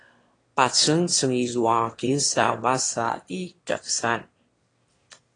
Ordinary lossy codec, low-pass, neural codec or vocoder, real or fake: AAC, 32 kbps; 9.9 kHz; autoencoder, 22.05 kHz, a latent of 192 numbers a frame, VITS, trained on one speaker; fake